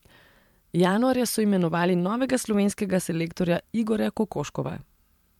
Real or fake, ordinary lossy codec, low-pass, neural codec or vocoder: fake; MP3, 96 kbps; 19.8 kHz; vocoder, 44.1 kHz, 128 mel bands every 512 samples, BigVGAN v2